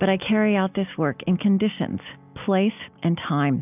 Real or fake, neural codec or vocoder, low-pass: real; none; 3.6 kHz